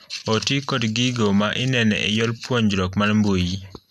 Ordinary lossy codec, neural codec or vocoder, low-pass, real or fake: none; none; 14.4 kHz; real